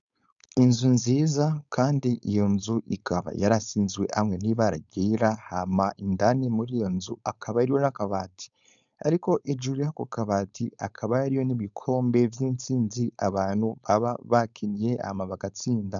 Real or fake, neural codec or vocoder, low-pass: fake; codec, 16 kHz, 4.8 kbps, FACodec; 7.2 kHz